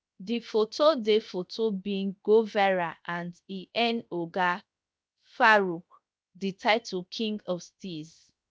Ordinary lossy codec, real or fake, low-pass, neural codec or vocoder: none; fake; none; codec, 16 kHz, 0.7 kbps, FocalCodec